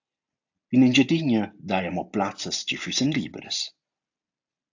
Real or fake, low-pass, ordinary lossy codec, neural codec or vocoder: real; 7.2 kHz; AAC, 48 kbps; none